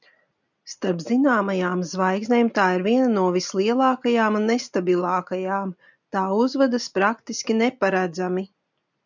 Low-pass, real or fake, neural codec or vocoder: 7.2 kHz; real; none